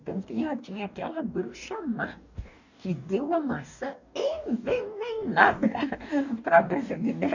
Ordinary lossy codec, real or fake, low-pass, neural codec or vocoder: none; fake; 7.2 kHz; codec, 44.1 kHz, 2.6 kbps, DAC